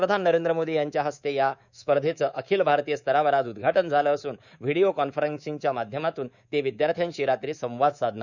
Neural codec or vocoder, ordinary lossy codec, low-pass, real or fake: codec, 24 kHz, 3.1 kbps, DualCodec; none; 7.2 kHz; fake